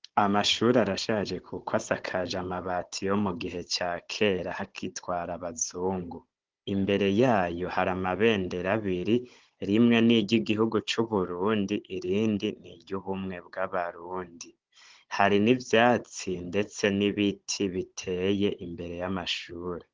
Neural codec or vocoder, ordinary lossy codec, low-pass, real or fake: codec, 16 kHz, 16 kbps, FunCodec, trained on Chinese and English, 50 frames a second; Opus, 16 kbps; 7.2 kHz; fake